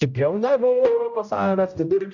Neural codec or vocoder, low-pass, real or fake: codec, 16 kHz, 0.5 kbps, X-Codec, HuBERT features, trained on general audio; 7.2 kHz; fake